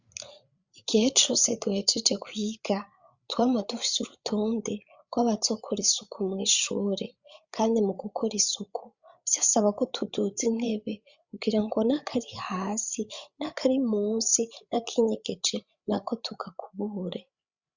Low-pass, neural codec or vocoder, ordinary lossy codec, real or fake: 7.2 kHz; codec, 16 kHz, 8 kbps, FreqCodec, larger model; Opus, 64 kbps; fake